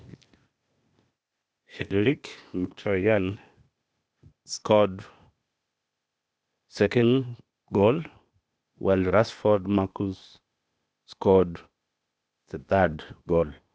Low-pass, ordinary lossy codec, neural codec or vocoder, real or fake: none; none; codec, 16 kHz, 0.8 kbps, ZipCodec; fake